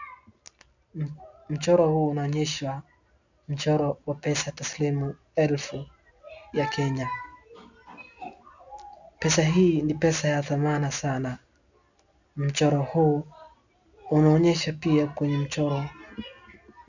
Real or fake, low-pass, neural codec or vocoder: real; 7.2 kHz; none